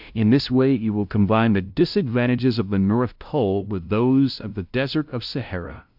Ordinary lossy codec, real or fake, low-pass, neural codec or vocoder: AAC, 48 kbps; fake; 5.4 kHz; codec, 16 kHz, 0.5 kbps, FunCodec, trained on LibriTTS, 25 frames a second